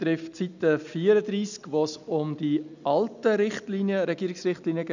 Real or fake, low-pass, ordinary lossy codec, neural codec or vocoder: real; 7.2 kHz; none; none